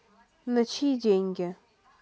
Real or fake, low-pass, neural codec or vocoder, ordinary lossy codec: real; none; none; none